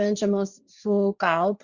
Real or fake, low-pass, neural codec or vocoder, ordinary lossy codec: fake; 7.2 kHz; codec, 16 kHz, 1.1 kbps, Voila-Tokenizer; Opus, 64 kbps